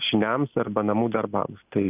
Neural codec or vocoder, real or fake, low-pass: none; real; 3.6 kHz